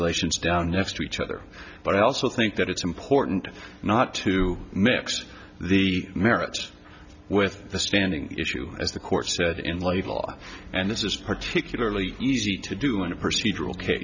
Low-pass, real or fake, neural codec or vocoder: 7.2 kHz; real; none